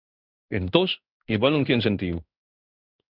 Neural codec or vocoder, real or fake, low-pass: codec, 16 kHz in and 24 kHz out, 1 kbps, XY-Tokenizer; fake; 5.4 kHz